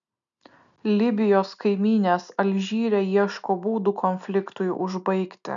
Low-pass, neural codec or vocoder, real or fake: 7.2 kHz; none; real